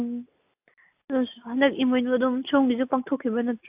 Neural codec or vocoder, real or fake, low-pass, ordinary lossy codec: none; real; 3.6 kHz; AAC, 32 kbps